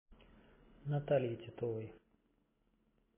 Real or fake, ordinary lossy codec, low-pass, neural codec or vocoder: real; MP3, 16 kbps; 3.6 kHz; none